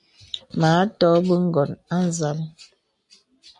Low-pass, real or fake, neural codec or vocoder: 10.8 kHz; real; none